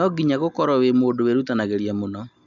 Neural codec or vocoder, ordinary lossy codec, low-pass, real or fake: none; none; 7.2 kHz; real